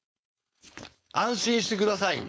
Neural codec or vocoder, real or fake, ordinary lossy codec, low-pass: codec, 16 kHz, 4.8 kbps, FACodec; fake; none; none